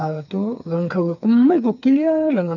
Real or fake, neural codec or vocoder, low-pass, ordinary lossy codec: fake; codec, 16 kHz, 4 kbps, FreqCodec, smaller model; 7.2 kHz; none